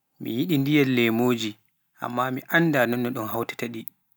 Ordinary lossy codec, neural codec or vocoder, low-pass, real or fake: none; none; none; real